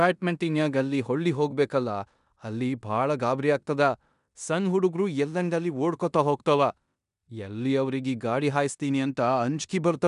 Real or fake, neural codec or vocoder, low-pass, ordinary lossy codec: fake; codec, 16 kHz in and 24 kHz out, 0.9 kbps, LongCat-Audio-Codec, four codebook decoder; 10.8 kHz; none